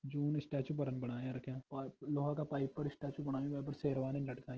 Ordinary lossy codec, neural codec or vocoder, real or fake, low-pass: Opus, 16 kbps; none; real; 7.2 kHz